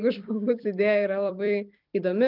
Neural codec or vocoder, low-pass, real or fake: vocoder, 44.1 kHz, 128 mel bands every 256 samples, BigVGAN v2; 5.4 kHz; fake